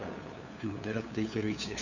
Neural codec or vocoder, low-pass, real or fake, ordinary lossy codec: codec, 16 kHz, 2 kbps, FunCodec, trained on LibriTTS, 25 frames a second; 7.2 kHz; fake; none